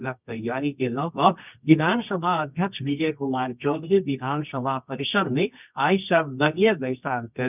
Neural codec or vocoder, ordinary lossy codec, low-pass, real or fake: codec, 24 kHz, 0.9 kbps, WavTokenizer, medium music audio release; none; 3.6 kHz; fake